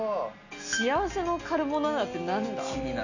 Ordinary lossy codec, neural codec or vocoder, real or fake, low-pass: none; none; real; 7.2 kHz